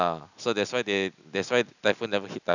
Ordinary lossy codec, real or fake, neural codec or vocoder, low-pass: none; real; none; 7.2 kHz